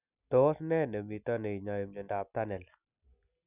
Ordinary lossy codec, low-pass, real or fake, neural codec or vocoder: none; 3.6 kHz; real; none